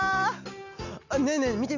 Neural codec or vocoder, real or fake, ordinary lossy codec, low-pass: none; real; none; 7.2 kHz